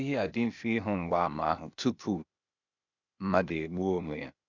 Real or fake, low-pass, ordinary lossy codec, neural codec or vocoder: fake; 7.2 kHz; none; codec, 16 kHz, 0.8 kbps, ZipCodec